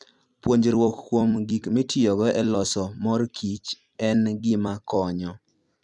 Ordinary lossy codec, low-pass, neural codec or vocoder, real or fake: none; 10.8 kHz; vocoder, 44.1 kHz, 128 mel bands every 256 samples, BigVGAN v2; fake